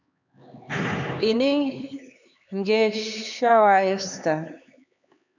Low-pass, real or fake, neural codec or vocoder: 7.2 kHz; fake; codec, 16 kHz, 4 kbps, X-Codec, HuBERT features, trained on LibriSpeech